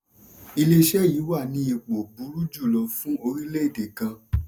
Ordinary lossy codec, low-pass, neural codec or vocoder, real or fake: none; none; none; real